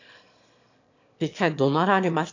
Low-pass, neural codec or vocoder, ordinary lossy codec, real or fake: 7.2 kHz; autoencoder, 22.05 kHz, a latent of 192 numbers a frame, VITS, trained on one speaker; AAC, 48 kbps; fake